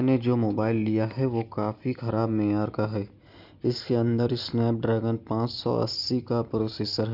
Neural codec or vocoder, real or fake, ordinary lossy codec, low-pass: none; real; none; 5.4 kHz